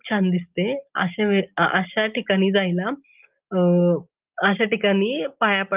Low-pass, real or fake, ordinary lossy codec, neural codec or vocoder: 3.6 kHz; real; Opus, 32 kbps; none